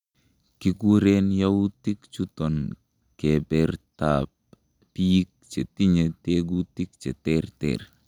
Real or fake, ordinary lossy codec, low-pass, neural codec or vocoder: real; none; 19.8 kHz; none